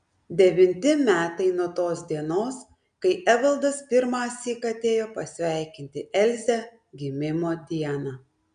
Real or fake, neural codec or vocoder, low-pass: real; none; 9.9 kHz